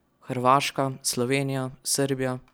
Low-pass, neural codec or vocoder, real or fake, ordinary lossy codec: none; none; real; none